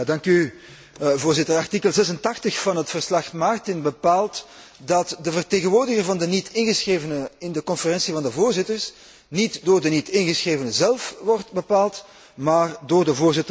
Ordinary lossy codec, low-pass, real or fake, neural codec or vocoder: none; none; real; none